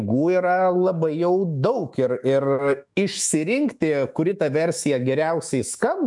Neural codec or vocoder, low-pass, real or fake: autoencoder, 48 kHz, 128 numbers a frame, DAC-VAE, trained on Japanese speech; 10.8 kHz; fake